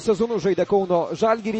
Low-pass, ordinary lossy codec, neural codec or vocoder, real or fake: 10.8 kHz; MP3, 32 kbps; none; real